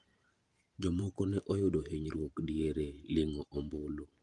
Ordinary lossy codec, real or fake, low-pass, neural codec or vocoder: Opus, 32 kbps; fake; 10.8 kHz; vocoder, 44.1 kHz, 128 mel bands every 512 samples, BigVGAN v2